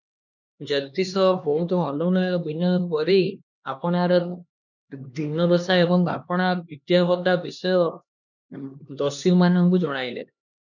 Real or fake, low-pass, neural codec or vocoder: fake; 7.2 kHz; codec, 16 kHz, 2 kbps, X-Codec, HuBERT features, trained on LibriSpeech